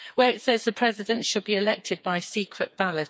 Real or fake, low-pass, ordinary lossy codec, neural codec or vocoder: fake; none; none; codec, 16 kHz, 4 kbps, FreqCodec, smaller model